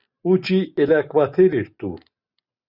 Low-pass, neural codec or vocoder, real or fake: 5.4 kHz; none; real